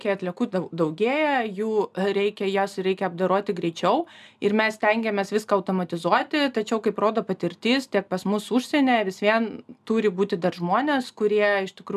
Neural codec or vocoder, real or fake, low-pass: none; real; 14.4 kHz